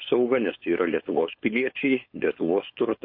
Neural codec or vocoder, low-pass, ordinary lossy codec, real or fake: codec, 16 kHz, 4.8 kbps, FACodec; 5.4 kHz; MP3, 32 kbps; fake